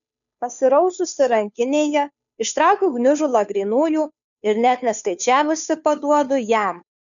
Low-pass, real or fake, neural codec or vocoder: 7.2 kHz; fake; codec, 16 kHz, 2 kbps, FunCodec, trained on Chinese and English, 25 frames a second